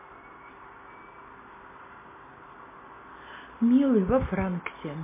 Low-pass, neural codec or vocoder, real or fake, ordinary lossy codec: 3.6 kHz; none; real; MP3, 16 kbps